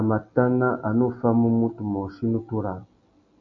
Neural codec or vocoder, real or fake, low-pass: none; real; 7.2 kHz